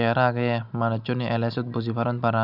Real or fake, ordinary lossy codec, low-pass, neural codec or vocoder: real; none; 5.4 kHz; none